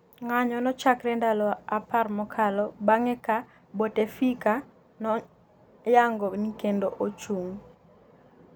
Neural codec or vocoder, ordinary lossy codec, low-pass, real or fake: none; none; none; real